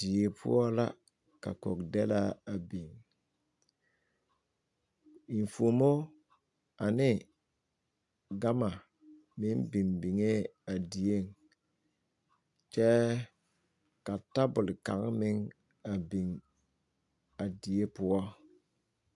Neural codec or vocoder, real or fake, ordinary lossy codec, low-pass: none; real; AAC, 64 kbps; 10.8 kHz